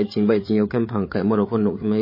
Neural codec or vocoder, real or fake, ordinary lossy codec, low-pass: codec, 16 kHz, 8 kbps, FreqCodec, larger model; fake; MP3, 24 kbps; 5.4 kHz